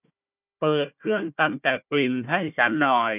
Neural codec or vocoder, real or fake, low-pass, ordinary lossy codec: codec, 16 kHz, 1 kbps, FunCodec, trained on Chinese and English, 50 frames a second; fake; 3.6 kHz; none